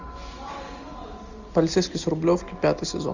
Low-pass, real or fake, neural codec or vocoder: 7.2 kHz; real; none